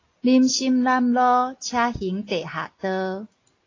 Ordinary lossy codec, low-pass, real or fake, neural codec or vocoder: AAC, 32 kbps; 7.2 kHz; real; none